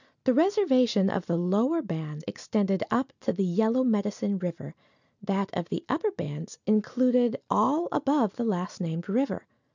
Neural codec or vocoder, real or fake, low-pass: none; real; 7.2 kHz